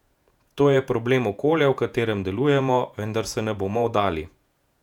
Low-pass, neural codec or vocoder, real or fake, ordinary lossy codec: 19.8 kHz; vocoder, 48 kHz, 128 mel bands, Vocos; fake; none